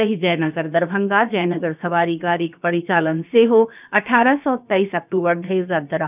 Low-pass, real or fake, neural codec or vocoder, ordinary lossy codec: 3.6 kHz; fake; codec, 16 kHz, about 1 kbps, DyCAST, with the encoder's durations; none